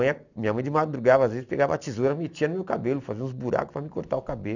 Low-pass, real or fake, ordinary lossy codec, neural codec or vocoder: 7.2 kHz; real; none; none